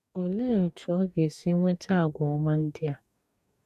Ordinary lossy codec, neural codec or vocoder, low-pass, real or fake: none; codec, 44.1 kHz, 2.6 kbps, DAC; 14.4 kHz; fake